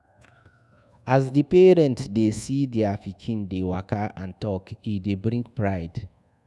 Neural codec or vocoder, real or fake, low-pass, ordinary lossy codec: codec, 24 kHz, 1.2 kbps, DualCodec; fake; none; none